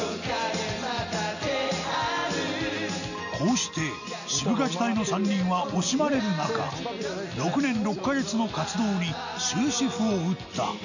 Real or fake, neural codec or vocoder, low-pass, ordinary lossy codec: real; none; 7.2 kHz; none